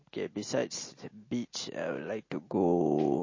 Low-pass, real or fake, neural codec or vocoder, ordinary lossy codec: 7.2 kHz; real; none; MP3, 32 kbps